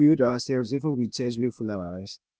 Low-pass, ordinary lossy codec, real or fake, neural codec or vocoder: none; none; fake; codec, 16 kHz, 0.8 kbps, ZipCodec